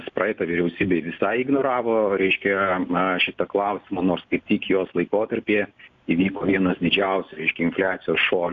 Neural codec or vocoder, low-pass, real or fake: none; 7.2 kHz; real